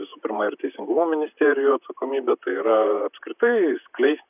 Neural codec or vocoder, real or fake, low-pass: vocoder, 44.1 kHz, 128 mel bands, Pupu-Vocoder; fake; 3.6 kHz